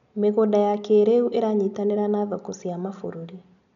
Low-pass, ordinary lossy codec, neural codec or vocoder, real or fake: 7.2 kHz; none; none; real